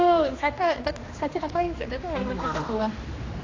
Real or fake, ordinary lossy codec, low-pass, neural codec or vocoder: fake; AAC, 32 kbps; 7.2 kHz; codec, 16 kHz, 2 kbps, X-Codec, HuBERT features, trained on general audio